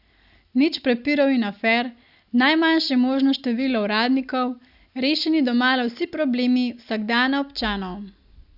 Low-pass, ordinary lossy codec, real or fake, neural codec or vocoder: 5.4 kHz; Opus, 64 kbps; real; none